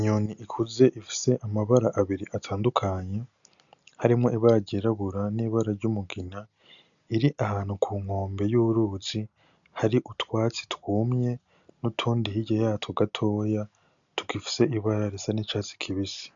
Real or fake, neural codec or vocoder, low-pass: real; none; 7.2 kHz